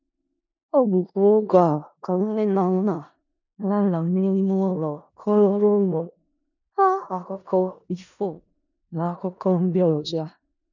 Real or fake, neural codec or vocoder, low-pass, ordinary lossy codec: fake; codec, 16 kHz in and 24 kHz out, 0.4 kbps, LongCat-Audio-Codec, four codebook decoder; 7.2 kHz; none